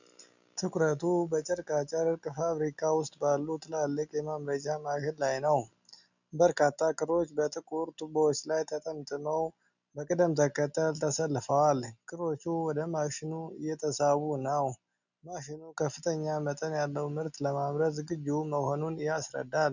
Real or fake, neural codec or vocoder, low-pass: real; none; 7.2 kHz